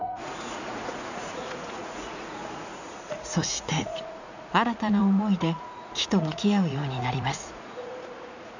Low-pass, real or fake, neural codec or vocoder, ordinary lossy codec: 7.2 kHz; fake; vocoder, 44.1 kHz, 80 mel bands, Vocos; none